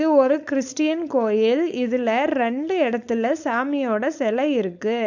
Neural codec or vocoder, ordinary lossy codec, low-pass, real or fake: codec, 16 kHz, 4.8 kbps, FACodec; none; 7.2 kHz; fake